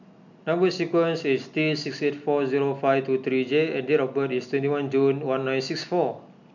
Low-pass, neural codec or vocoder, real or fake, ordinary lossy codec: 7.2 kHz; none; real; none